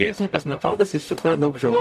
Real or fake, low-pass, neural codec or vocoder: fake; 14.4 kHz; codec, 44.1 kHz, 0.9 kbps, DAC